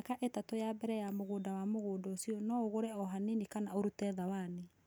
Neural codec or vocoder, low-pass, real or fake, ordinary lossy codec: none; none; real; none